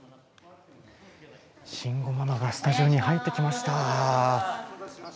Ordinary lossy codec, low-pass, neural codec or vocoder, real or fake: none; none; none; real